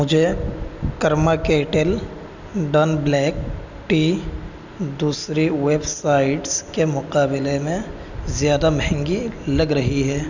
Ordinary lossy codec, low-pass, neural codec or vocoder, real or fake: none; 7.2 kHz; none; real